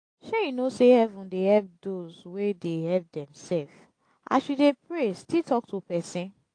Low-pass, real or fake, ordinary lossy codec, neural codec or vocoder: 9.9 kHz; real; AAC, 48 kbps; none